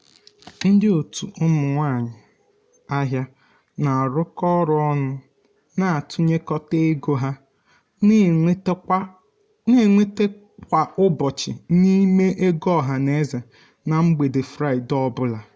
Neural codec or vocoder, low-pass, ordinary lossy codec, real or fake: none; none; none; real